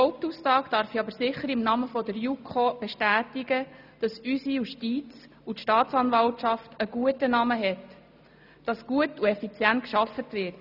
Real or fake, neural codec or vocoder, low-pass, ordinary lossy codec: real; none; 5.4 kHz; none